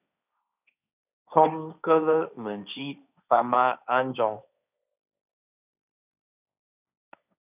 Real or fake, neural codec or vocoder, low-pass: fake; codec, 16 kHz, 1.1 kbps, Voila-Tokenizer; 3.6 kHz